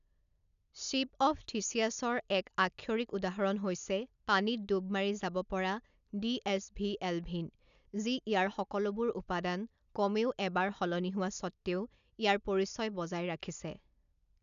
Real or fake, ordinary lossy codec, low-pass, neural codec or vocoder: real; none; 7.2 kHz; none